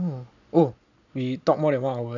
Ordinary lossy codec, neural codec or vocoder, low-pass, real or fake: none; none; 7.2 kHz; real